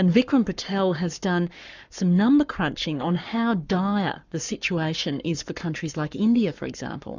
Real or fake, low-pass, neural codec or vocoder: fake; 7.2 kHz; codec, 44.1 kHz, 7.8 kbps, Pupu-Codec